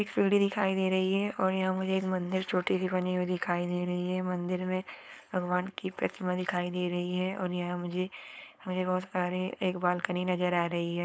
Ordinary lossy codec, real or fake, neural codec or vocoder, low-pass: none; fake; codec, 16 kHz, 4.8 kbps, FACodec; none